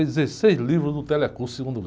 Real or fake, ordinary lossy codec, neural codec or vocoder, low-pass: real; none; none; none